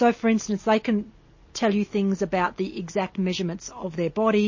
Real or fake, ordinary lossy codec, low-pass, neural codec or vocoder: real; MP3, 32 kbps; 7.2 kHz; none